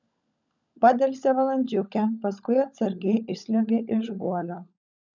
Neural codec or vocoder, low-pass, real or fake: codec, 16 kHz, 16 kbps, FunCodec, trained on LibriTTS, 50 frames a second; 7.2 kHz; fake